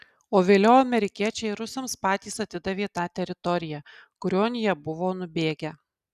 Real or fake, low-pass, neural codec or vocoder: real; 14.4 kHz; none